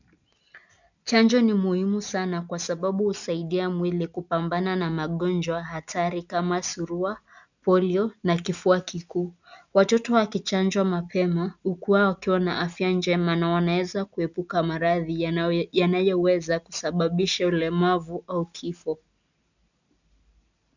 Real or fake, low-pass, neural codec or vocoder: real; 7.2 kHz; none